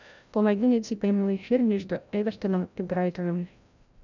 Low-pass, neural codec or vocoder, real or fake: 7.2 kHz; codec, 16 kHz, 0.5 kbps, FreqCodec, larger model; fake